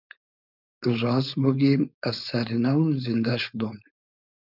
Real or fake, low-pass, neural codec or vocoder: fake; 5.4 kHz; codec, 16 kHz, 4.8 kbps, FACodec